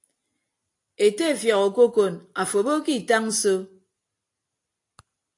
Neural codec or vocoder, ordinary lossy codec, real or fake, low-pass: none; AAC, 48 kbps; real; 10.8 kHz